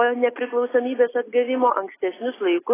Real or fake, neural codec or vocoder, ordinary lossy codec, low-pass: real; none; AAC, 16 kbps; 3.6 kHz